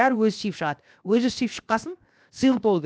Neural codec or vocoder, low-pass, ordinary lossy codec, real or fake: codec, 16 kHz, 0.7 kbps, FocalCodec; none; none; fake